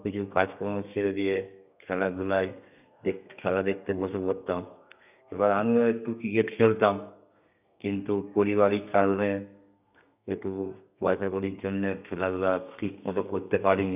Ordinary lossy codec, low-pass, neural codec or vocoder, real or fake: none; 3.6 kHz; codec, 32 kHz, 1.9 kbps, SNAC; fake